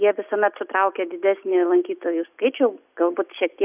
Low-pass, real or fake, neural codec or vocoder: 3.6 kHz; real; none